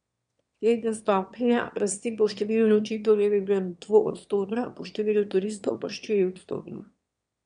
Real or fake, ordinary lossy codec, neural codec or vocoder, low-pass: fake; MP3, 64 kbps; autoencoder, 22.05 kHz, a latent of 192 numbers a frame, VITS, trained on one speaker; 9.9 kHz